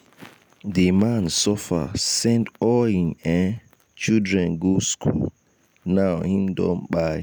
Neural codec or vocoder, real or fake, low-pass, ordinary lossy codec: none; real; none; none